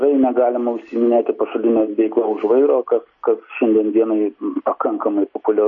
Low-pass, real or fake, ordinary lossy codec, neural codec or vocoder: 7.2 kHz; real; MP3, 32 kbps; none